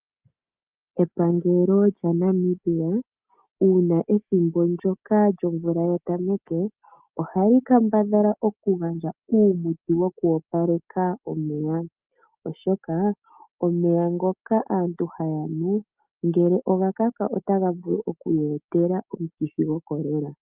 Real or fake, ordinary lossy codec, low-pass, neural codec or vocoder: real; Opus, 32 kbps; 3.6 kHz; none